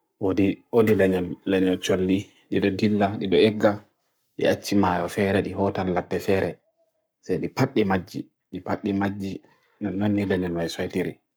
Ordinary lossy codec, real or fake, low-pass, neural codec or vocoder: none; fake; none; codec, 44.1 kHz, 7.8 kbps, Pupu-Codec